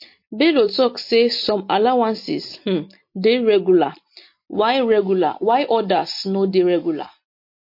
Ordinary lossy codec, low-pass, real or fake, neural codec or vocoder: MP3, 32 kbps; 5.4 kHz; real; none